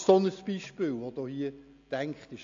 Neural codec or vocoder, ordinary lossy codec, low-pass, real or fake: none; AAC, 48 kbps; 7.2 kHz; real